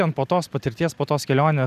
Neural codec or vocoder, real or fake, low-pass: none; real; 14.4 kHz